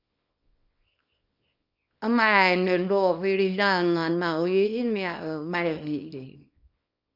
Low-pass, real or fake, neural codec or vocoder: 5.4 kHz; fake; codec, 24 kHz, 0.9 kbps, WavTokenizer, small release